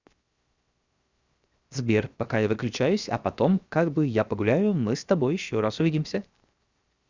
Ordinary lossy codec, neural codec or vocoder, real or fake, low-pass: Opus, 64 kbps; codec, 16 kHz, 0.7 kbps, FocalCodec; fake; 7.2 kHz